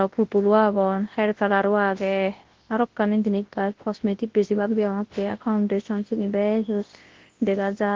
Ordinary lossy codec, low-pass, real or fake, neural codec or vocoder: Opus, 16 kbps; 7.2 kHz; fake; codec, 24 kHz, 0.9 kbps, WavTokenizer, large speech release